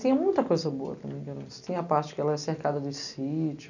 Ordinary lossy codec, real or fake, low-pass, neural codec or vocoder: none; real; 7.2 kHz; none